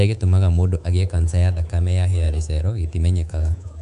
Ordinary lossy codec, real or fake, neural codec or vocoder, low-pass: none; fake; codec, 24 kHz, 3.1 kbps, DualCodec; 10.8 kHz